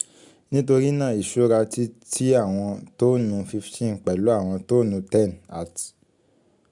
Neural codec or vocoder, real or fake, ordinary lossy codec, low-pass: none; real; MP3, 96 kbps; 10.8 kHz